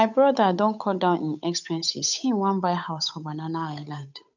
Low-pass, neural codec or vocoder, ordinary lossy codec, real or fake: 7.2 kHz; codec, 16 kHz, 8 kbps, FunCodec, trained on Chinese and English, 25 frames a second; none; fake